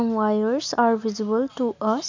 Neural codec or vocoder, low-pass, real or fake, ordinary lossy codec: none; 7.2 kHz; real; none